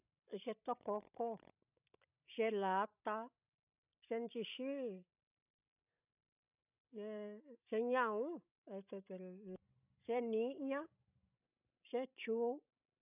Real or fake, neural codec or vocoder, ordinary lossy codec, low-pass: fake; codec, 16 kHz, 16 kbps, FreqCodec, larger model; none; 3.6 kHz